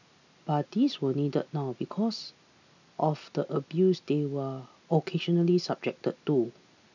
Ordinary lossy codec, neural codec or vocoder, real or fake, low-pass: none; none; real; 7.2 kHz